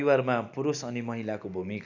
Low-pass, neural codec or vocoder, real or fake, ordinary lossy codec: 7.2 kHz; none; real; none